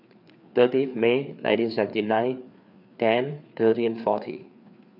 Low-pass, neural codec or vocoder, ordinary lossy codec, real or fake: 5.4 kHz; codec, 16 kHz, 4 kbps, FreqCodec, larger model; none; fake